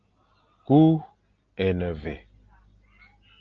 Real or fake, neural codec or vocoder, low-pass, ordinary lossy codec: real; none; 7.2 kHz; Opus, 24 kbps